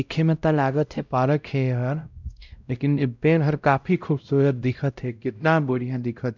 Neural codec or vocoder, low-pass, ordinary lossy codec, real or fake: codec, 16 kHz, 0.5 kbps, X-Codec, WavLM features, trained on Multilingual LibriSpeech; 7.2 kHz; none; fake